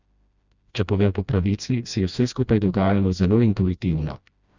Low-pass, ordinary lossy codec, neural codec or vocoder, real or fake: 7.2 kHz; Opus, 64 kbps; codec, 16 kHz, 1 kbps, FreqCodec, smaller model; fake